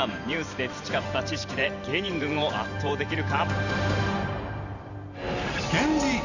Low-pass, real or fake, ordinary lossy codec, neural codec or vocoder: 7.2 kHz; fake; none; vocoder, 44.1 kHz, 128 mel bands every 512 samples, BigVGAN v2